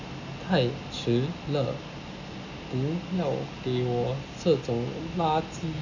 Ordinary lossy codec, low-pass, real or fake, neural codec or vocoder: none; 7.2 kHz; real; none